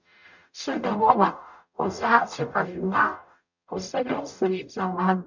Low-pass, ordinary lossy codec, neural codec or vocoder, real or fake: 7.2 kHz; none; codec, 44.1 kHz, 0.9 kbps, DAC; fake